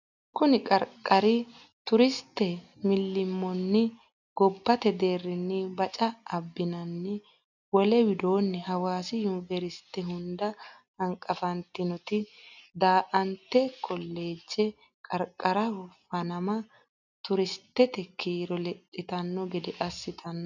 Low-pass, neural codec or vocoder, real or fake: 7.2 kHz; none; real